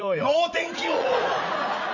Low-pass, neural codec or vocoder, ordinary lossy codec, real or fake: 7.2 kHz; vocoder, 44.1 kHz, 80 mel bands, Vocos; none; fake